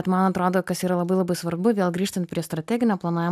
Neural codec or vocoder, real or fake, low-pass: none; real; 14.4 kHz